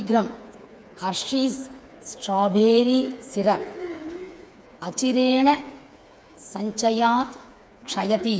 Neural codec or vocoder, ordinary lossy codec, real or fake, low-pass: codec, 16 kHz, 4 kbps, FreqCodec, smaller model; none; fake; none